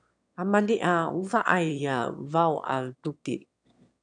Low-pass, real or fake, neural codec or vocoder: 9.9 kHz; fake; autoencoder, 22.05 kHz, a latent of 192 numbers a frame, VITS, trained on one speaker